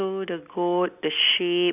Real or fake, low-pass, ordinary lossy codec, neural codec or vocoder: real; 3.6 kHz; none; none